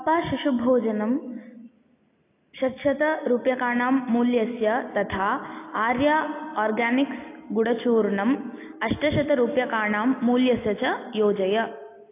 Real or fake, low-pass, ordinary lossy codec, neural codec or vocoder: real; 3.6 kHz; AAC, 24 kbps; none